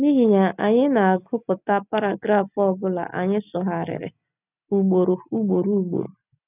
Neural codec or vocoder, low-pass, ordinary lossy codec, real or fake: none; 3.6 kHz; none; real